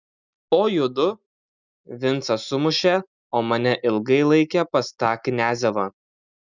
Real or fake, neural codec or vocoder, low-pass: real; none; 7.2 kHz